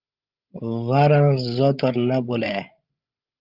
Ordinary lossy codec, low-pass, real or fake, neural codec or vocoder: Opus, 32 kbps; 5.4 kHz; fake; codec, 16 kHz, 16 kbps, FreqCodec, larger model